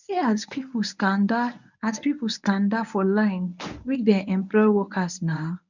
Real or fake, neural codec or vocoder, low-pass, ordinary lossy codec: fake; codec, 24 kHz, 0.9 kbps, WavTokenizer, medium speech release version 1; 7.2 kHz; none